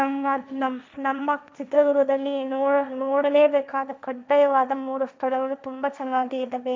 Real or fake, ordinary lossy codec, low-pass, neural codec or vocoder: fake; none; none; codec, 16 kHz, 1.1 kbps, Voila-Tokenizer